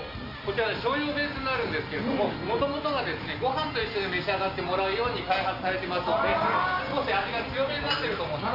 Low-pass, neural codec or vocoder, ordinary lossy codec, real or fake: 5.4 kHz; none; none; real